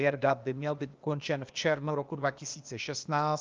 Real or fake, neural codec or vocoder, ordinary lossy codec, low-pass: fake; codec, 16 kHz, 0.8 kbps, ZipCodec; Opus, 24 kbps; 7.2 kHz